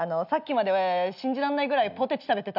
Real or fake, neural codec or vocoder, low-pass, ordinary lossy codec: real; none; 5.4 kHz; none